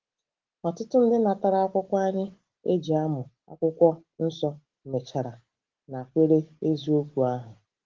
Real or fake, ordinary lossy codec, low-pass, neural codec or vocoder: real; Opus, 16 kbps; 7.2 kHz; none